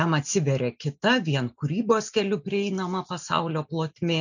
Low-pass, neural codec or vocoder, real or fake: 7.2 kHz; none; real